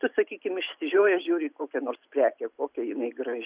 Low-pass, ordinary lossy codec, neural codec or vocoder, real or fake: 3.6 kHz; Opus, 64 kbps; none; real